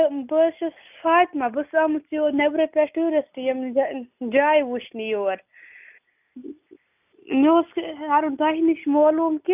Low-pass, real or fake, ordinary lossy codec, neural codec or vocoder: 3.6 kHz; real; none; none